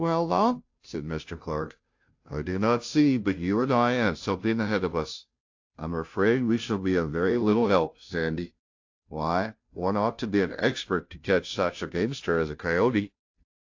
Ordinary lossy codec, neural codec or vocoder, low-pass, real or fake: AAC, 48 kbps; codec, 16 kHz, 0.5 kbps, FunCodec, trained on Chinese and English, 25 frames a second; 7.2 kHz; fake